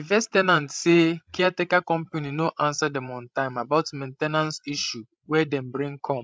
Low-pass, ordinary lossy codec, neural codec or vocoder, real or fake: none; none; codec, 16 kHz, 16 kbps, FreqCodec, larger model; fake